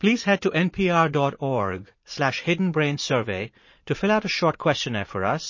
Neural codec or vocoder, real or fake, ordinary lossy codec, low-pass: vocoder, 44.1 kHz, 80 mel bands, Vocos; fake; MP3, 32 kbps; 7.2 kHz